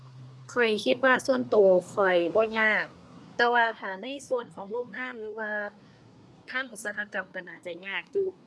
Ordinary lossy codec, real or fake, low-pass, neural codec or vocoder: none; fake; none; codec, 24 kHz, 1 kbps, SNAC